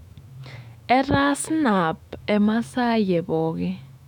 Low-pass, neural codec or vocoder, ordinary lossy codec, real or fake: 19.8 kHz; autoencoder, 48 kHz, 128 numbers a frame, DAC-VAE, trained on Japanese speech; none; fake